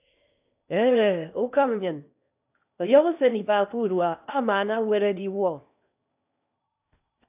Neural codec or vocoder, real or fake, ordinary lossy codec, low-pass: codec, 16 kHz in and 24 kHz out, 0.8 kbps, FocalCodec, streaming, 65536 codes; fake; AAC, 32 kbps; 3.6 kHz